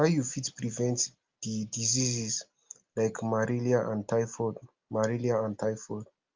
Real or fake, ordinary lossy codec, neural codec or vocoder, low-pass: real; Opus, 24 kbps; none; 7.2 kHz